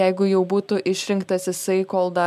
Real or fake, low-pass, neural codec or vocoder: real; 14.4 kHz; none